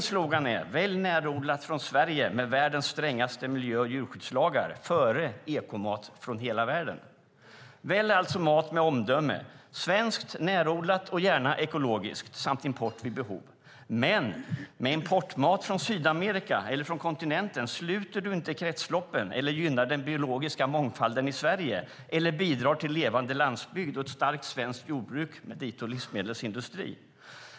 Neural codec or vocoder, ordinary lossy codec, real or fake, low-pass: none; none; real; none